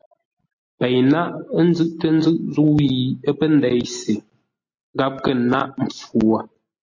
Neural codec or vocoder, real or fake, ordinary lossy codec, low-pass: none; real; MP3, 32 kbps; 7.2 kHz